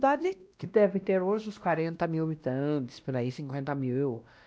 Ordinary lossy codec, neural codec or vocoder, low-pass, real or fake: none; codec, 16 kHz, 1 kbps, X-Codec, WavLM features, trained on Multilingual LibriSpeech; none; fake